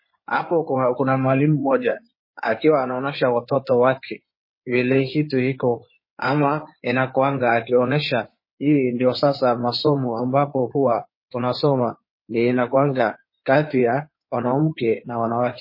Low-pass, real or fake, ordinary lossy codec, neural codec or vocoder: 5.4 kHz; fake; MP3, 24 kbps; codec, 16 kHz in and 24 kHz out, 2.2 kbps, FireRedTTS-2 codec